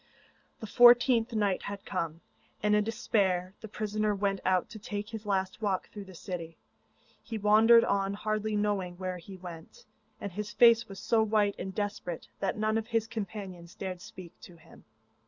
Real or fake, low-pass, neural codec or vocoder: real; 7.2 kHz; none